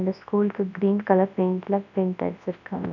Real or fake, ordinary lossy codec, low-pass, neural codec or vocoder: fake; none; 7.2 kHz; codec, 24 kHz, 0.9 kbps, WavTokenizer, large speech release